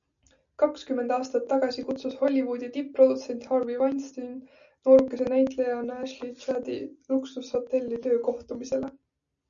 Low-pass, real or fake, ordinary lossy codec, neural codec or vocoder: 7.2 kHz; real; MP3, 96 kbps; none